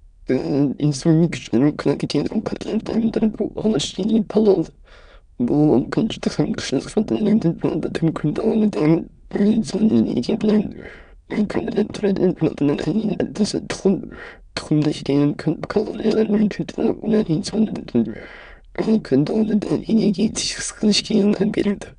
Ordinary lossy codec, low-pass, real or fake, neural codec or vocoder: none; 9.9 kHz; fake; autoencoder, 22.05 kHz, a latent of 192 numbers a frame, VITS, trained on many speakers